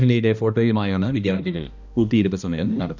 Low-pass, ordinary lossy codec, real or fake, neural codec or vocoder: 7.2 kHz; none; fake; codec, 16 kHz, 1 kbps, X-Codec, HuBERT features, trained on balanced general audio